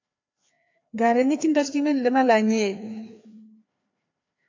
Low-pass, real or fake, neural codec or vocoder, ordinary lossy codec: 7.2 kHz; fake; codec, 16 kHz, 2 kbps, FreqCodec, larger model; AAC, 48 kbps